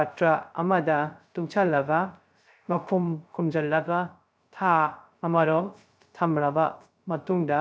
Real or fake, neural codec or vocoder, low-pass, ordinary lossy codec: fake; codec, 16 kHz, 0.3 kbps, FocalCodec; none; none